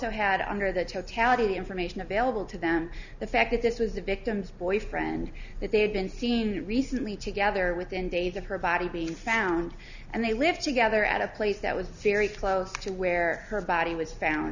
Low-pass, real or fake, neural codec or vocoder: 7.2 kHz; real; none